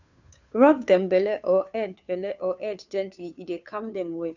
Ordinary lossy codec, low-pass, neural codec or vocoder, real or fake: none; 7.2 kHz; codec, 16 kHz, 2 kbps, FunCodec, trained on Chinese and English, 25 frames a second; fake